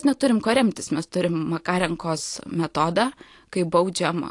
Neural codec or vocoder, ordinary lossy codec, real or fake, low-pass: none; AAC, 48 kbps; real; 10.8 kHz